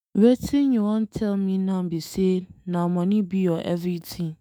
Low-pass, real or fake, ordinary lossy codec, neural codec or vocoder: none; fake; none; autoencoder, 48 kHz, 128 numbers a frame, DAC-VAE, trained on Japanese speech